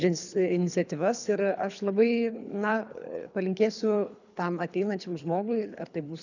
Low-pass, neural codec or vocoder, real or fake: 7.2 kHz; codec, 24 kHz, 3 kbps, HILCodec; fake